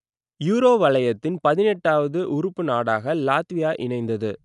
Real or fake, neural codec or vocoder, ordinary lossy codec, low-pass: real; none; none; 10.8 kHz